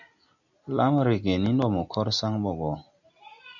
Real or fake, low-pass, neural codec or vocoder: real; 7.2 kHz; none